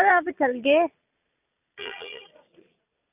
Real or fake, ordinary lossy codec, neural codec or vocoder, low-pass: fake; none; vocoder, 22.05 kHz, 80 mel bands, Vocos; 3.6 kHz